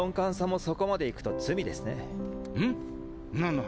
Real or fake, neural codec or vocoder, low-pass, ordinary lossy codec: real; none; none; none